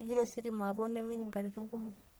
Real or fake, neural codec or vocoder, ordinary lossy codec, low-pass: fake; codec, 44.1 kHz, 1.7 kbps, Pupu-Codec; none; none